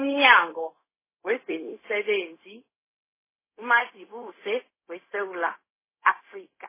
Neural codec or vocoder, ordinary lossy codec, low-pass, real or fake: codec, 16 kHz, 0.4 kbps, LongCat-Audio-Codec; MP3, 16 kbps; 3.6 kHz; fake